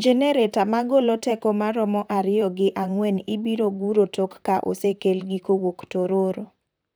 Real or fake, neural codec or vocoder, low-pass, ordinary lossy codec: fake; vocoder, 44.1 kHz, 128 mel bands, Pupu-Vocoder; none; none